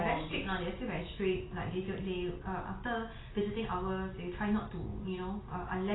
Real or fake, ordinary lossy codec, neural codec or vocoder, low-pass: real; AAC, 16 kbps; none; 7.2 kHz